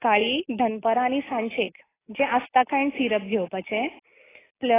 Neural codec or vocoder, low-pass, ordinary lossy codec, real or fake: none; 3.6 kHz; AAC, 16 kbps; real